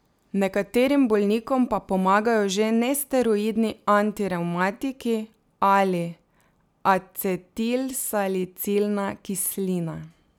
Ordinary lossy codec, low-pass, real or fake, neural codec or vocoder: none; none; real; none